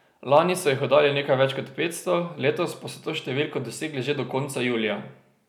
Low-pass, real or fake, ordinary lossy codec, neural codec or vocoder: 19.8 kHz; real; none; none